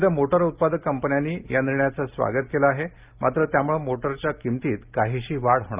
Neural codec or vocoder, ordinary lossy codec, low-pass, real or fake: none; Opus, 32 kbps; 3.6 kHz; real